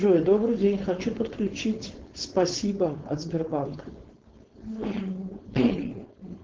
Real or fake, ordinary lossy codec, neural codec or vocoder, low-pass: fake; Opus, 16 kbps; codec, 16 kHz, 4.8 kbps, FACodec; 7.2 kHz